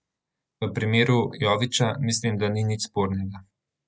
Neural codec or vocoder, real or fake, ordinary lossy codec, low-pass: none; real; none; none